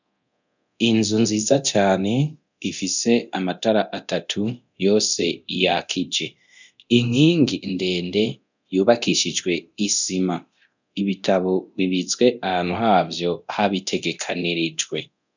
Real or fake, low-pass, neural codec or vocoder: fake; 7.2 kHz; codec, 24 kHz, 0.9 kbps, DualCodec